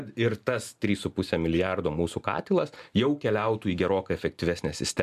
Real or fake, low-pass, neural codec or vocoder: real; 14.4 kHz; none